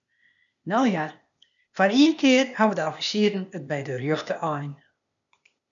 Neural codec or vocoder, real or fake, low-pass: codec, 16 kHz, 0.8 kbps, ZipCodec; fake; 7.2 kHz